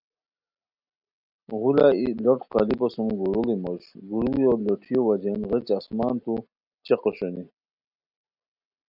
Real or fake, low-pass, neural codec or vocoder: real; 5.4 kHz; none